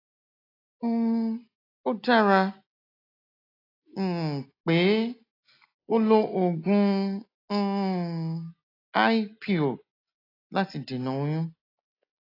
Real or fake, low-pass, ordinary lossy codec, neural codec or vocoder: real; 5.4 kHz; AAC, 24 kbps; none